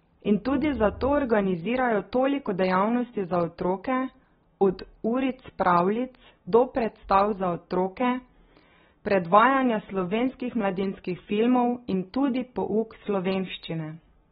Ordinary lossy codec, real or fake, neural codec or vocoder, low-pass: AAC, 16 kbps; real; none; 19.8 kHz